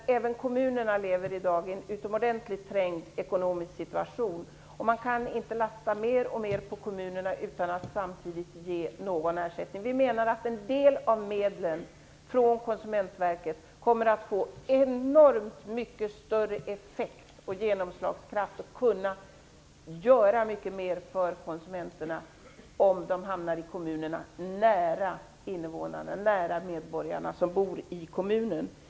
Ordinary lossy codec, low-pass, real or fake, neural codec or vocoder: none; none; real; none